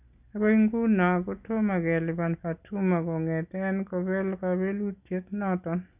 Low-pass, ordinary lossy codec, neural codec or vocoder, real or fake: 3.6 kHz; none; none; real